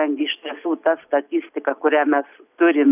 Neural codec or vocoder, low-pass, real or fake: none; 3.6 kHz; real